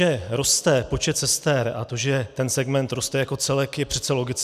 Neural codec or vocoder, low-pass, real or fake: none; 14.4 kHz; real